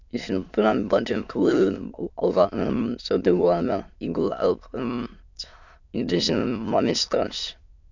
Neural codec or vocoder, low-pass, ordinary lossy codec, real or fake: autoencoder, 22.05 kHz, a latent of 192 numbers a frame, VITS, trained on many speakers; 7.2 kHz; none; fake